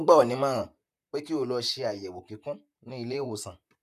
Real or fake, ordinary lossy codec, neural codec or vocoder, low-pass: fake; none; vocoder, 44.1 kHz, 128 mel bands, Pupu-Vocoder; 14.4 kHz